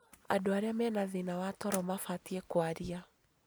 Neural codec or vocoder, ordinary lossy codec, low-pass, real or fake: none; none; none; real